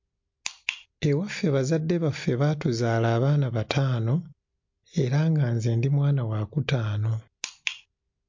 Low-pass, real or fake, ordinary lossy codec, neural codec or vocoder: 7.2 kHz; real; MP3, 48 kbps; none